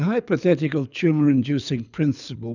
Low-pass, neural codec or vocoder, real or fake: 7.2 kHz; codec, 24 kHz, 6 kbps, HILCodec; fake